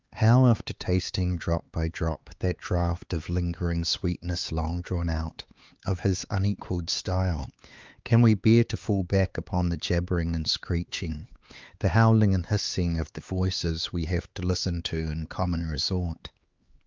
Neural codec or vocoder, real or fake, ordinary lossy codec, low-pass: codec, 16 kHz, 4 kbps, X-Codec, HuBERT features, trained on LibriSpeech; fake; Opus, 24 kbps; 7.2 kHz